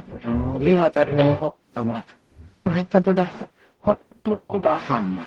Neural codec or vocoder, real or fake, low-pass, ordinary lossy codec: codec, 44.1 kHz, 0.9 kbps, DAC; fake; 19.8 kHz; Opus, 16 kbps